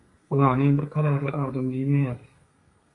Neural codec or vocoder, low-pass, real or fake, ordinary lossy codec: codec, 32 kHz, 1.9 kbps, SNAC; 10.8 kHz; fake; MP3, 48 kbps